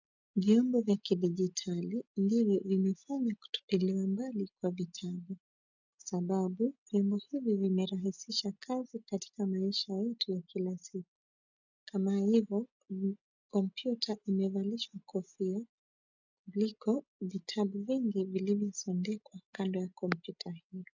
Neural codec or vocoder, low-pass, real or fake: none; 7.2 kHz; real